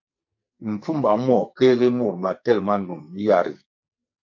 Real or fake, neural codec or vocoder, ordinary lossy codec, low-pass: fake; codec, 44.1 kHz, 2.6 kbps, SNAC; MP3, 48 kbps; 7.2 kHz